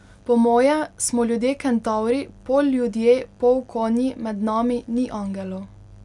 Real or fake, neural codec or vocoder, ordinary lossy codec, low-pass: real; none; none; 10.8 kHz